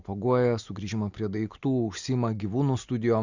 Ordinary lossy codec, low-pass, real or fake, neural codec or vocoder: Opus, 64 kbps; 7.2 kHz; real; none